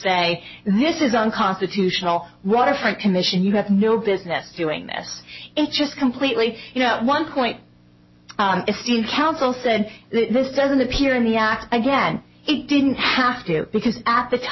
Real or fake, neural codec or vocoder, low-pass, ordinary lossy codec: real; none; 7.2 kHz; MP3, 24 kbps